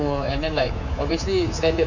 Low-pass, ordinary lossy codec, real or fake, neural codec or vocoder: 7.2 kHz; none; fake; codec, 24 kHz, 3.1 kbps, DualCodec